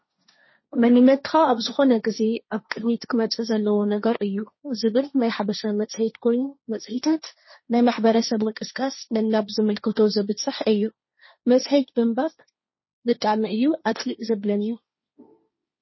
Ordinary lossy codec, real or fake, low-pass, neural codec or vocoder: MP3, 24 kbps; fake; 7.2 kHz; codec, 16 kHz, 1.1 kbps, Voila-Tokenizer